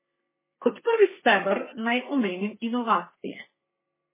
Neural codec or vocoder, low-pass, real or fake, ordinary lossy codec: codec, 32 kHz, 1.9 kbps, SNAC; 3.6 kHz; fake; MP3, 16 kbps